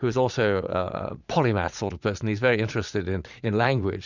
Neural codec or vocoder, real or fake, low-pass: vocoder, 44.1 kHz, 80 mel bands, Vocos; fake; 7.2 kHz